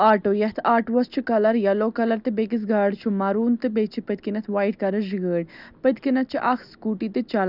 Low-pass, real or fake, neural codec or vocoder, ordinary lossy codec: 5.4 kHz; real; none; none